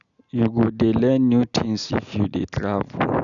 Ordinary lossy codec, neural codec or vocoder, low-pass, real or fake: none; none; 7.2 kHz; real